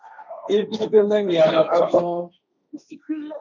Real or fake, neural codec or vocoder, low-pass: fake; codec, 16 kHz, 1.1 kbps, Voila-Tokenizer; 7.2 kHz